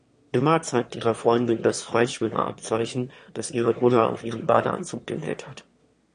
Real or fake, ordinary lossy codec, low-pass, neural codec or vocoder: fake; MP3, 48 kbps; 9.9 kHz; autoencoder, 22.05 kHz, a latent of 192 numbers a frame, VITS, trained on one speaker